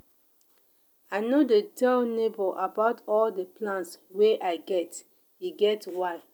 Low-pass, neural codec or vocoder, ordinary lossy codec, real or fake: none; none; none; real